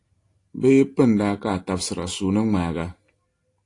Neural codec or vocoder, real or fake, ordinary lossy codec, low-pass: none; real; AAC, 48 kbps; 10.8 kHz